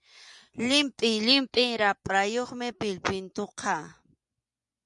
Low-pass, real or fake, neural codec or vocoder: 10.8 kHz; fake; vocoder, 24 kHz, 100 mel bands, Vocos